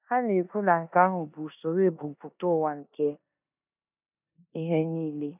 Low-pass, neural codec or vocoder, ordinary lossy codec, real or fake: 3.6 kHz; codec, 16 kHz in and 24 kHz out, 0.9 kbps, LongCat-Audio-Codec, four codebook decoder; none; fake